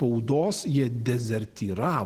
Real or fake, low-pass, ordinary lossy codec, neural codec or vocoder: real; 14.4 kHz; Opus, 16 kbps; none